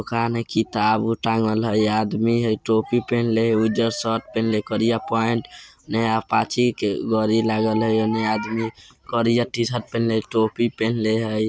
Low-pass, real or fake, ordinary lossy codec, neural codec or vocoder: none; real; none; none